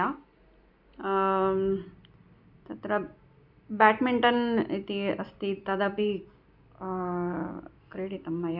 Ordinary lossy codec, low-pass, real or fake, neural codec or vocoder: none; 5.4 kHz; real; none